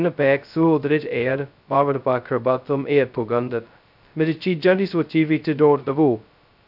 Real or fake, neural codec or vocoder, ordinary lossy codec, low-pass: fake; codec, 16 kHz, 0.2 kbps, FocalCodec; none; 5.4 kHz